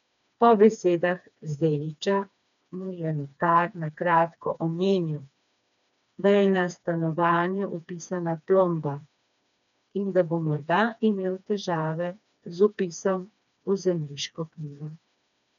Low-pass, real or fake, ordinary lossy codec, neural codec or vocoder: 7.2 kHz; fake; none; codec, 16 kHz, 2 kbps, FreqCodec, smaller model